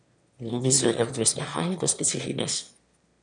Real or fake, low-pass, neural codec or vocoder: fake; 9.9 kHz; autoencoder, 22.05 kHz, a latent of 192 numbers a frame, VITS, trained on one speaker